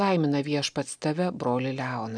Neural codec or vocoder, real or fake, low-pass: none; real; 9.9 kHz